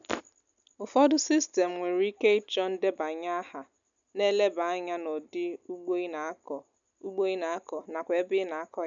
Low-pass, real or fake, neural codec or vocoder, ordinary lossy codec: 7.2 kHz; real; none; none